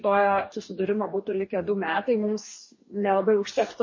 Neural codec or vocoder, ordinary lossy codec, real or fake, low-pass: codec, 44.1 kHz, 2.6 kbps, DAC; MP3, 32 kbps; fake; 7.2 kHz